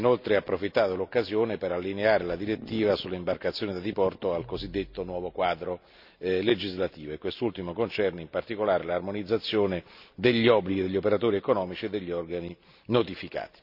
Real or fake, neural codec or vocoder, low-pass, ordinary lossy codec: real; none; 5.4 kHz; none